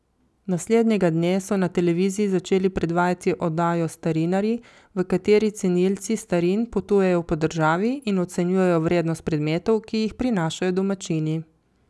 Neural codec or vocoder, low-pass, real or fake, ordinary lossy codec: none; none; real; none